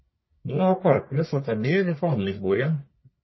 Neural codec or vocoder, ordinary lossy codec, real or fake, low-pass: codec, 44.1 kHz, 1.7 kbps, Pupu-Codec; MP3, 24 kbps; fake; 7.2 kHz